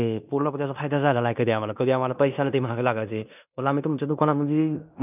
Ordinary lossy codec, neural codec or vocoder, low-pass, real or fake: none; codec, 16 kHz in and 24 kHz out, 0.9 kbps, LongCat-Audio-Codec, fine tuned four codebook decoder; 3.6 kHz; fake